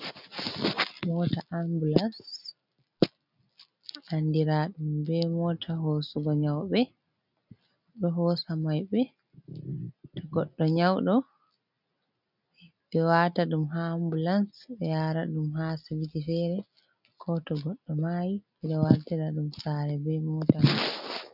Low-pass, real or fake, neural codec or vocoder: 5.4 kHz; real; none